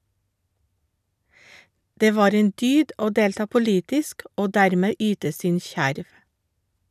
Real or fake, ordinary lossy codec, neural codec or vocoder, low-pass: real; none; none; 14.4 kHz